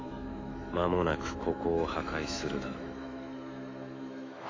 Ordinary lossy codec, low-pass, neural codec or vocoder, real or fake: AAC, 32 kbps; 7.2 kHz; autoencoder, 48 kHz, 128 numbers a frame, DAC-VAE, trained on Japanese speech; fake